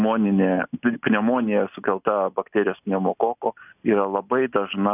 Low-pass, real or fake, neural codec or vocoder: 3.6 kHz; real; none